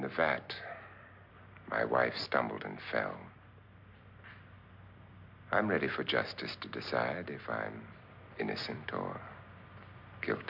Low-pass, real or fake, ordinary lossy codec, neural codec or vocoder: 5.4 kHz; real; MP3, 48 kbps; none